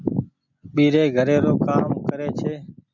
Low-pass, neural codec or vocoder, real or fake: 7.2 kHz; none; real